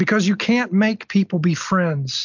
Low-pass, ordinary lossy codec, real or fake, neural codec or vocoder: 7.2 kHz; MP3, 64 kbps; real; none